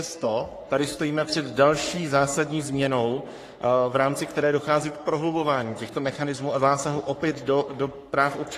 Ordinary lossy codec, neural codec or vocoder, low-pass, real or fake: AAC, 48 kbps; codec, 44.1 kHz, 3.4 kbps, Pupu-Codec; 14.4 kHz; fake